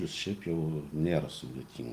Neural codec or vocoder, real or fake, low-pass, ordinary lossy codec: none; real; 14.4 kHz; Opus, 24 kbps